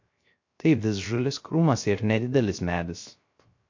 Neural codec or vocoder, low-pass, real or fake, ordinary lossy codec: codec, 16 kHz, 0.3 kbps, FocalCodec; 7.2 kHz; fake; MP3, 48 kbps